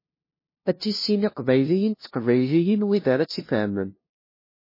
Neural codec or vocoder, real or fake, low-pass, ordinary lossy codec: codec, 16 kHz, 0.5 kbps, FunCodec, trained on LibriTTS, 25 frames a second; fake; 5.4 kHz; MP3, 24 kbps